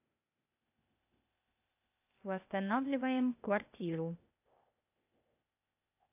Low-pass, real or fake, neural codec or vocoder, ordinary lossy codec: 3.6 kHz; fake; codec, 16 kHz, 0.8 kbps, ZipCodec; AAC, 24 kbps